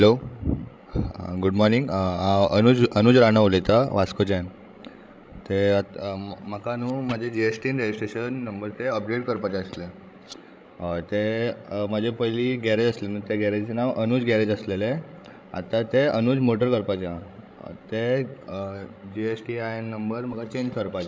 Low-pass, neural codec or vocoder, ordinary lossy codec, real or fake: none; codec, 16 kHz, 16 kbps, FreqCodec, larger model; none; fake